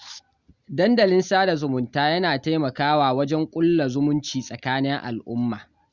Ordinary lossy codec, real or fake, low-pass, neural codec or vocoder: Opus, 64 kbps; real; 7.2 kHz; none